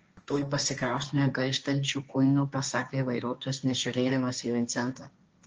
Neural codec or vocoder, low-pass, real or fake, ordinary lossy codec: codec, 16 kHz, 1.1 kbps, Voila-Tokenizer; 7.2 kHz; fake; Opus, 24 kbps